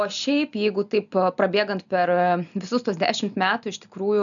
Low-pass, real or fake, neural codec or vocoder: 7.2 kHz; real; none